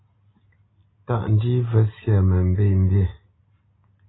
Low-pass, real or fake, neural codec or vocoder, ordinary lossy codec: 7.2 kHz; real; none; AAC, 16 kbps